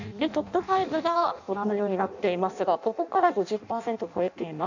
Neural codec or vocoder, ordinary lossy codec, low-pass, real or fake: codec, 16 kHz in and 24 kHz out, 0.6 kbps, FireRedTTS-2 codec; none; 7.2 kHz; fake